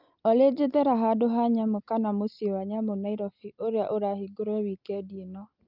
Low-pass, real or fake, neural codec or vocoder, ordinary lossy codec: 5.4 kHz; real; none; Opus, 24 kbps